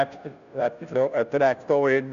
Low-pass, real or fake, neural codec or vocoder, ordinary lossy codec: 7.2 kHz; fake; codec, 16 kHz, 0.5 kbps, FunCodec, trained on Chinese and English, 25 frames a second; none